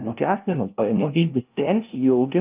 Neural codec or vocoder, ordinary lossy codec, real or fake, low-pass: codec, 16 kHz, 0.5 kbps, FunCodec, trained on LibriTTS, 25 frames a second; Opus, 24 kbps; fake; 3.6 kHz